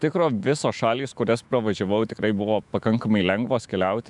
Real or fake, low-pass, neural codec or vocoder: fake; 10.8 kHz; autoencoder, 48 kHz, 128 numbers a frame, DAC-VAE, trained on Japanese speech